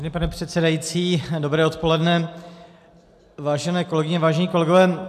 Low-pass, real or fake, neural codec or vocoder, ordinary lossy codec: 14.4 kHz; real; none; MP3, 96 kbps